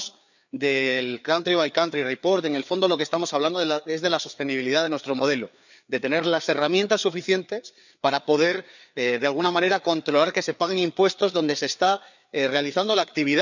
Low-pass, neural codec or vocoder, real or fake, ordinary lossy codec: 7.2 kHz; codec, 16 kHz, 4 kbps, FreqCodec, larger model; fake; none